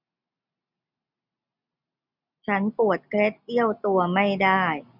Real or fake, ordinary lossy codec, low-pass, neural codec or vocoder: real; none; 5.4 kHz; none